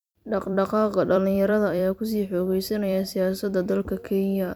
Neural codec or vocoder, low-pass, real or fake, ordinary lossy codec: vocoder, 44.1 kHz, 128 mel bands every 256 samples, BigVGAN v2; none; fake; none